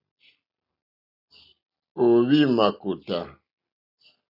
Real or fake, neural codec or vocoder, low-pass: real; none; 5.4 kHz